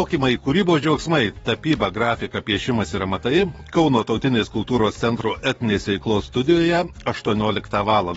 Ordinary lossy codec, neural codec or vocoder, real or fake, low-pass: AAC, 24 kbps; codec, 44.1 kHz, 7.8 kbps, DAC; fake; 19.8 kHz